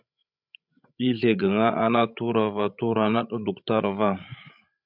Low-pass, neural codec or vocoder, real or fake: 5.4 kHz; codec, 16 kHz, 16 kbps, FreqCodec, larger model; fake